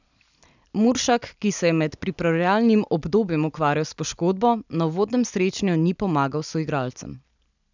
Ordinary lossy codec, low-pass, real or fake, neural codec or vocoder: none; 7.2 kHz; real; none